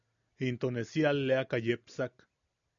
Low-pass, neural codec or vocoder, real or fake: 7.2 kHz; none; real